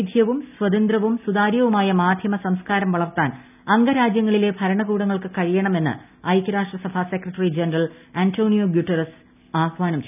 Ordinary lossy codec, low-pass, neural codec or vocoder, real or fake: none; 3.6 kHz; none; real